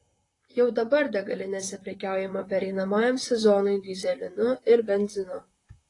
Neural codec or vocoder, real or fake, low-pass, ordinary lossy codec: vocoder, 44.1 kHz, 128 mel bands, Pupu-Vocoder; fake; 10.8 kHz; AAC, 32 kbps